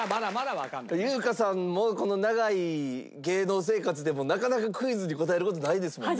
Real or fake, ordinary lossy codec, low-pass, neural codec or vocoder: real; none; none; none